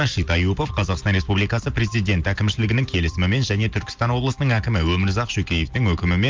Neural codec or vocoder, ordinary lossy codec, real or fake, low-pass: none; Opus, 32 kbps; real; 7.2 kHz